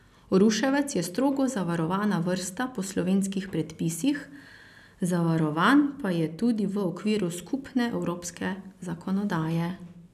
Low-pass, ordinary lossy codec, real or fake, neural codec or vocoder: 14.4 kHz; none; real; none